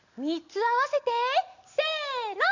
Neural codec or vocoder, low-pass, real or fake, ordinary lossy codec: none; 7.2 kHz; real; none